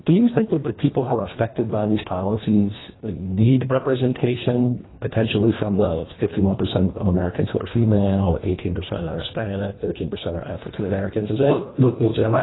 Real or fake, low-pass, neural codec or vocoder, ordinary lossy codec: fake; 7.2 kHz; codec, 24 kHz, 1.5 kbps, HILCodec; AAC, 16 kbps